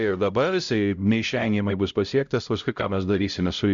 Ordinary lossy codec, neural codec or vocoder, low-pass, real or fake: Opus, 64 kbps; codec, 16 kHz, 0.5 kbps, X-Codec, HuBERT features, trained on LibriSpeech; 7.2 kHz; fake